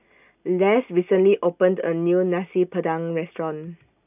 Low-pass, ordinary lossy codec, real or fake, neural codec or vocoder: 3.6 kHz; none; real; none